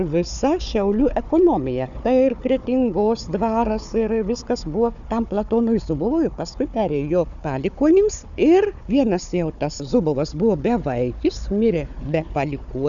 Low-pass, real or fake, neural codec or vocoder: 7.2 kHz; fake; codec, 16 kHz, 4 kbps, FunCodec, trained on Chinese and English, 50 frames a second